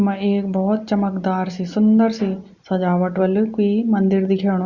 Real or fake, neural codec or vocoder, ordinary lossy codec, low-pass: real; none; Opus, 64 kbps; 7.2 kHz